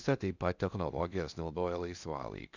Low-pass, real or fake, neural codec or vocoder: 7.2 kHz; fake; codec, 16 kHz in and 24 kHz out, 0.6 kbps, FocalCodec, streaming, 2048 codes